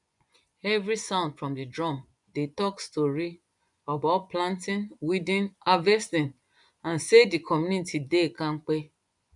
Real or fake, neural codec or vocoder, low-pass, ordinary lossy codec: fake; vocoder, 24 kHz, 100 mel bands, Vocos; 10.8 kHz; none